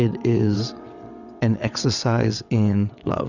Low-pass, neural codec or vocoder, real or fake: 7.2 kHz; vocoder, 22.05 kHz, 80 mel bands, WaveNeXt; fake